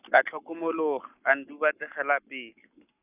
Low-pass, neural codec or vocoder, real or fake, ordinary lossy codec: 3.6 kHz; codec, 44.1 kHz, 7.8 kbps, Pupu-Codec; fake; none